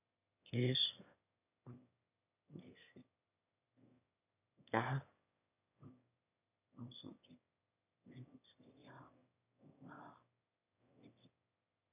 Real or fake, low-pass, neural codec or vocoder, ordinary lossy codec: fake; 3.6 kHz; autoencoder, 22.05 kHz, a latent of 192 numbers a frame, VITS, trained on one speaker; none